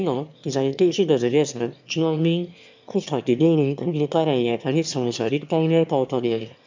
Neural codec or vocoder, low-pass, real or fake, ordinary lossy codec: autoencoder, 22.05 kHz, a latent of 192 numbers a frame, VITS, trained on one speaker; 7.2 kHz; fake; MP3, 64 kbps